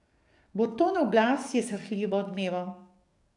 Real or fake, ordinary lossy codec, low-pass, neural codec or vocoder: fake; none; 10.8 kHz; codec, 44.1 kHz, 7.8 kbps, DAC